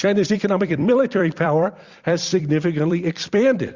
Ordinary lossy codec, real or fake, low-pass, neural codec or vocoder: Opus, 64 kbps; fake; 7.2 kHz; vocoder, 44.1 kHz, 128 mel bands every 256 samples, BigVGAN v2